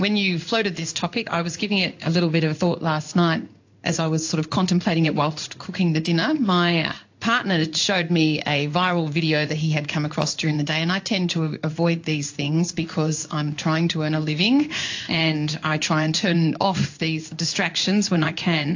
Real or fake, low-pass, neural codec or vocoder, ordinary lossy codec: fake; 7.2 kHz; codec, 16 kHz in and 24 kHz out, 1 kbps, XY-Tokenizer; AAC, 48 kbps